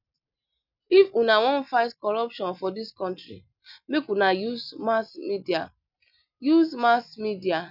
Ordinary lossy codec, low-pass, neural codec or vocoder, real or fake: none; 5.4 kHz; none; real